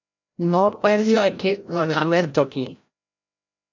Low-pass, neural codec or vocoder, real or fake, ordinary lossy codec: 7.2 kHz; codec, 16 kHz, 0.5 kbps, FreqCodec, larger model; fake; MP3, 48 kbps